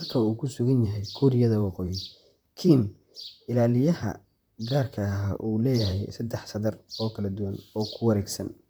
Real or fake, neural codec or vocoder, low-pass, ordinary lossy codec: fake; vocoder, 44.1 kHz, 128 mel bands, Pupu-Vocoder; none; none